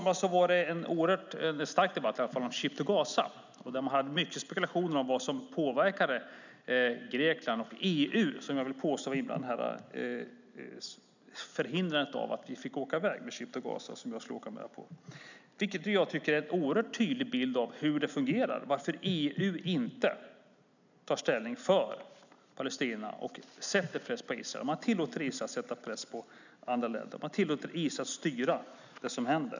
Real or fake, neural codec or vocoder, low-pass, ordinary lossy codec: real; none; 7.2 kHz; none